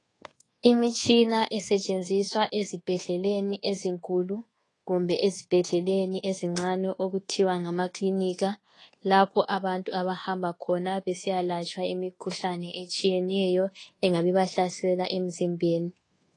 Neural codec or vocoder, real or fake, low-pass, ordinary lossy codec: codec, 24 kHz, 1.2 kbps, DualCodec; fake; 10.8 kHz; AAC, 32 kbps